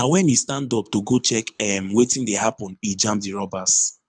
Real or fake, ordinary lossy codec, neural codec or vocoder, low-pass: fake; none; codec, 24 kHz, 6 kbps, HILCodec; 9.9 kHz